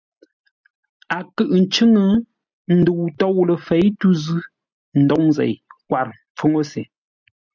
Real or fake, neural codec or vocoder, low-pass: real; none; 7.2 kHz